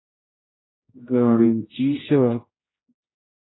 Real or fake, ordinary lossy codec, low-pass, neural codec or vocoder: fake; AAC, 16 kbps; 7.2 kHz; codec, 16 kHz, 0.5 kbps, X-Codec, HuBERT features, trained on general audio